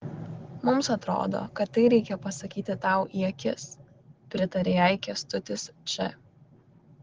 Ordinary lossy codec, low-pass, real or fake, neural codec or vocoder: Opus, 16 kbps; 7.2 kHz; real; none